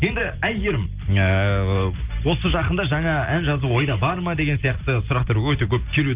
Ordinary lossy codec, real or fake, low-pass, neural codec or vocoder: Opus, 32 kbps; real; 3.6 kHz; none